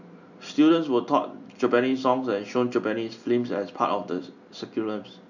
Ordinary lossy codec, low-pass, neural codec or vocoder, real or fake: none; 7.2 kHz; none; real